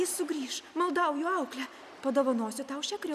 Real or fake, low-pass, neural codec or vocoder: real; 14.4 kHz; none